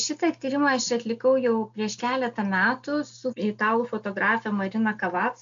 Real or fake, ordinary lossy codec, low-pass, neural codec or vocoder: real; AAC, 64 kbps; 7.2 kHz; none